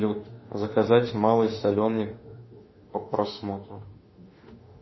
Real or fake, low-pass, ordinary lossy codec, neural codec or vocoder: fake; 7.2 kHz; MP3, 24 kbps; autoencoder, 48 kHz, 32 numbers a frame, DAC-VAE, trained on Japanese speech